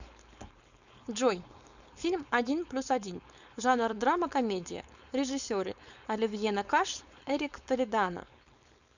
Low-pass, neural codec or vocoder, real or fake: 7.2 kHz; codec, 16 kHz, 4.8 kbps, FACodec; fake